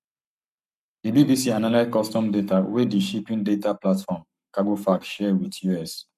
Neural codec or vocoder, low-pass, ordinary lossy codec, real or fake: codec, 44.1 kHz, 7.8 kbps, Pupu-Codec; 14.4 kHz; none; fake